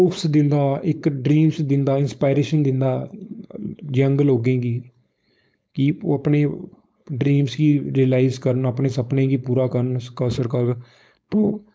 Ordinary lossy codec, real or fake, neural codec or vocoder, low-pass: none; fake; codec, 16 kHz, 4.8 kbps, FACodec; none